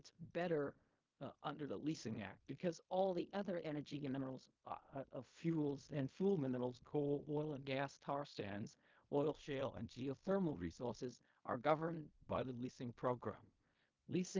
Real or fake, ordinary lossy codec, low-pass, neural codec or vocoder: fake; Opus, 32 kbps; 7.2 kHz; codec, 16 kHz in and 24 kHz out, 0.4 kbps, LongCat-Audio-Codec, fine tuned four codebook decoder